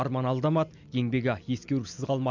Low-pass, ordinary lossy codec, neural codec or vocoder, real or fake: 7.2 kHz; none; none; real